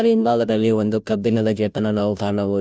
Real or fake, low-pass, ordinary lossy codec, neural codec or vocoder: fake; none; none; codec, 16 kHz, 0.5 kbps, FunCodec, trained on Chinese and English, 25 frames a second